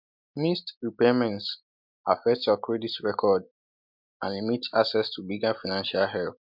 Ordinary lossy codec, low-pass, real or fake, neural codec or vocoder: MP3, 48 kbps; 5.4 kHz; real; none